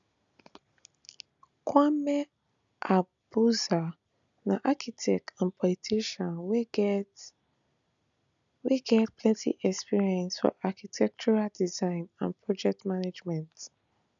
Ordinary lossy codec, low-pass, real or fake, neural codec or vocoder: none; 7.2 kHz; real; none